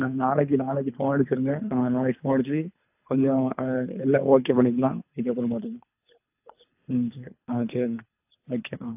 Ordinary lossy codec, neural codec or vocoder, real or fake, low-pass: AAC, 32 kbps; codec, 24 kHz, 3 kbps, HILCodec; fake; 3.6 kHz